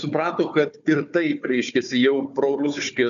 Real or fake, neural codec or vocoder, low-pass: fake; codec, 16 kHz, 4 kbps, FunCodec, trained on Chinese and English, 50 frames a second; 7.2 kHz